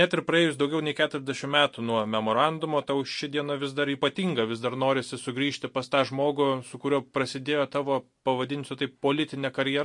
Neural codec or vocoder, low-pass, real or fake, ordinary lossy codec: none; 10.8 kHz; real; MP3, 48 kbps